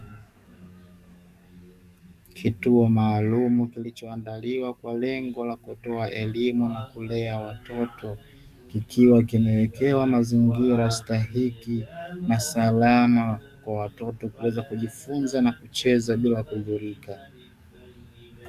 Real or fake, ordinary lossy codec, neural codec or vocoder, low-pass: fake; Opus, 64 kbps; codec, 44.1 kHz, 7.8 kbps, DAC; 14.4 kHz